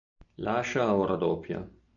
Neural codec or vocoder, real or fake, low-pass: none; real; 7.2 kHz